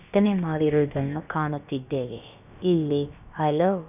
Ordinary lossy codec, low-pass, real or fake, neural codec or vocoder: none; 3.6 kHz; fake; codec, 16 kHz, about 1 kbps, DyCAST, with the encoder's durations